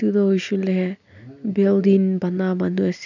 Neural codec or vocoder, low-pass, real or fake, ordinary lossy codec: none; 7.2 kHz; real; none